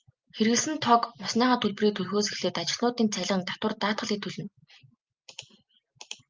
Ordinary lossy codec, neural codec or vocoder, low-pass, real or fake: Opus, 24 kbps; none; 7.2 kHz; real